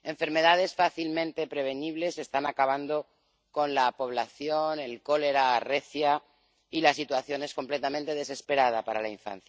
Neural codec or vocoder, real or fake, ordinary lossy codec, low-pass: none; real; none; none